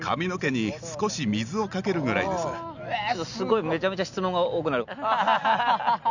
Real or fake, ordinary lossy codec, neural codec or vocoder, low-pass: real; none; none; 7.2 kHz